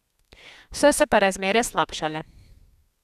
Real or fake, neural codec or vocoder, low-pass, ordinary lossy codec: fake; codec, 32 kHz, 1.9 kbps, SNAC; 14.4 kHz; none